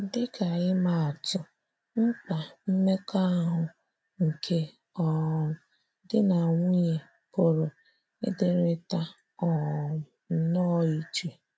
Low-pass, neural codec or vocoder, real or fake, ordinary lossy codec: none; none; real; none